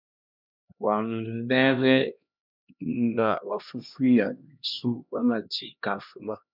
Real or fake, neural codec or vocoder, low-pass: fake; codec, 16 kHz, 1 kbps, X-Codec, HuBERT features, trained on LibriSpeech; 5.4 kHz